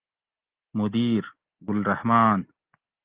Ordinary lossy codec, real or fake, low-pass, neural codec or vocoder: Opus, 16 kbps; real; 3.6 kHz; none